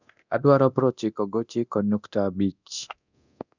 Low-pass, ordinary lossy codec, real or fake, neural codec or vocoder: 7.2 kHz; none; fake; codec, 24 kHz, 0.9 kbps, DualCodec